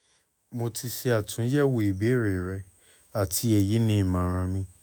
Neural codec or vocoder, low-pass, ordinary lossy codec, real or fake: autoencoder, 48 kHz, 128 numbers a frame, DAC-VAE, trained on Japanese speech; none; none; fake